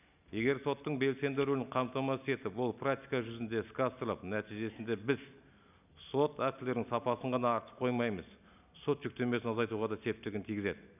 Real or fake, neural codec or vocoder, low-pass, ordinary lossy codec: real; none; 3.6 kHz; none